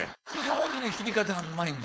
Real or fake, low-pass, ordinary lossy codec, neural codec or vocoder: fake; none; none; codec, 16 kHz, 4.8 kbps, FACodec